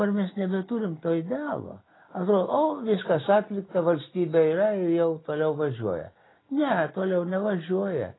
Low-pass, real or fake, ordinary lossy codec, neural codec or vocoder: 7.2 kHz; real; AAC, 16 kbps; none